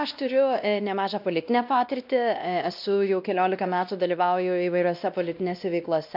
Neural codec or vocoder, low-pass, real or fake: codec, 16 kHz, 1 kbps, X-Codec, WavLM features, trained on Multilingual LibriSpeech; 5.4 kHz; fake